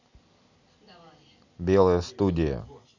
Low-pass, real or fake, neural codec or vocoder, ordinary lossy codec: 7.2 kHz; real; none; none